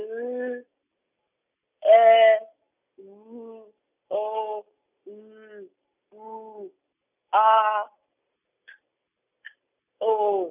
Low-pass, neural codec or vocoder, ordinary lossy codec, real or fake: 3.6 kHz; none; none; real